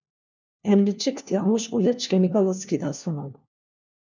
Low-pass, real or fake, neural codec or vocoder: 7.2 kHz; fake; codec, 16 kHz, 1 kbps, FunCodec, trained on LibriTTS, 50 frames a second